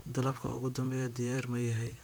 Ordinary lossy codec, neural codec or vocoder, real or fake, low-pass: none; vocoder, 44.1 kHz, 128 mel bands, Pupu-Vocoder; fake; none